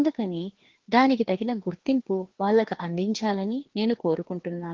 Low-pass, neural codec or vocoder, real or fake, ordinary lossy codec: 7.2 kHz; codec, 16 kHz, 2 kbps, FreqCodec, larger model; fake; Opus, 16 kbps